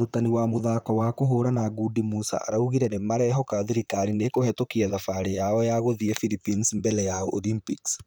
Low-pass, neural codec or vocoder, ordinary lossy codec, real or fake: none; vocoder, 44.1 kHz, 128 mel bands, Pupu-Vocoder; none; fake